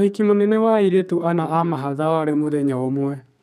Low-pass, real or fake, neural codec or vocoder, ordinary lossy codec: 14.4 kHz; fake; codec, 32 kHz, 1.9 kbps, SNAC; none